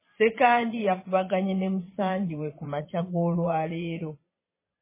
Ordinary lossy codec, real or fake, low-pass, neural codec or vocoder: MP3, 16 kbps; fake; 3.6 kHz; vocoder, 22.05 kHz, 80 mel bands, Vocos